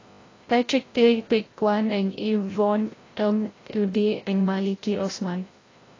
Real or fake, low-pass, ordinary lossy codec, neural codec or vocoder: fake; 7.2 kHz; AAC, 32 kbps; codec, 16 kHz, 0.5 kbps, FreqCodec, larger model